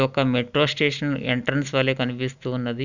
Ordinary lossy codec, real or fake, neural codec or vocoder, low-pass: none; real; none; 7.2 kHz